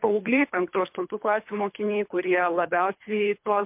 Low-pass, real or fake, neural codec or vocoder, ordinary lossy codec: 3.6 kHz; fake; codec, 24 kHz, 3 kbps, HILCodec; MP3, 32 kbps